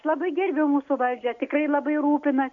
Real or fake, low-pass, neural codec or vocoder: real; 7.2 kHz; none